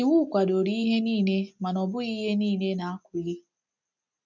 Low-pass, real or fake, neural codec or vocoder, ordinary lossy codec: 7.2 kHz; real; none; none